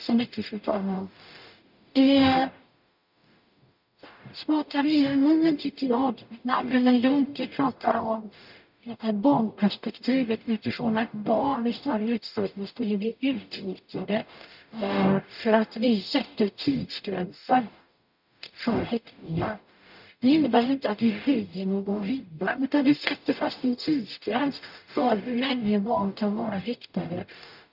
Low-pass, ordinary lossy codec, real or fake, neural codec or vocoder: 5.4 kHz; none; fake; codec, 44.1 kHz, 0.9 kbps, DAC